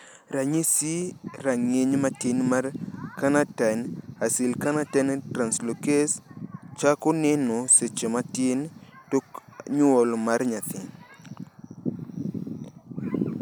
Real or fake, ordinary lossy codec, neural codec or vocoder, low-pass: real; none; none; none